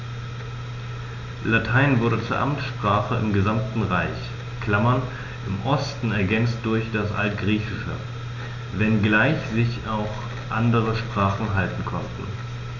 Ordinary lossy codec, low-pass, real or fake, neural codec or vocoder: none; 7.2 kHz; real; none